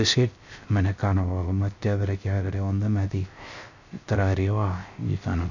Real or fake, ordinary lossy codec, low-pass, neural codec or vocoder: fake; none; 7.2 kHz; codec, 16 kHz, 0.3 kbps, FocalCodec